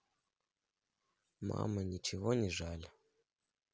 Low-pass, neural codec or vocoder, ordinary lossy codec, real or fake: none; none; none; real